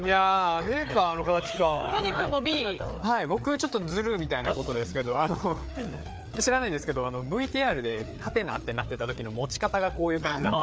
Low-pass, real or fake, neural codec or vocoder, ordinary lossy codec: none; fake; codec, 16 kHz, 4 kbps, FreqCodec, larger model; none